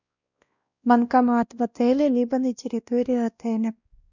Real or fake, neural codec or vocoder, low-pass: fake; codec, 16 kHz, 1 kbps, X-Codec, WavLM features, trained on Multilingual LibriSpeech; 7.2 kHz